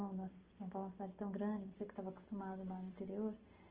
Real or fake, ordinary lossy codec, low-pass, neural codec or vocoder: real; Opus, 16 kbps; 3.6 kHz; none